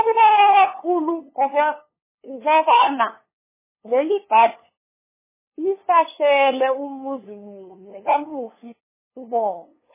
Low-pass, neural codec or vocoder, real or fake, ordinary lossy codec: 3.6 kHz; codec, 16 kHz, 2 kbps, FunCodec, trained on LibriTTS, 25 frames a second; fake; MP3, 16 kbps